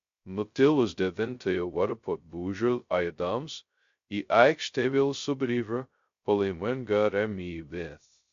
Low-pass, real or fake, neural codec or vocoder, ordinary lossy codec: 7.2 kHz; fake; codec, 16 kHz, 0.2 kbps, FocalCodec; MP3, 48 kbps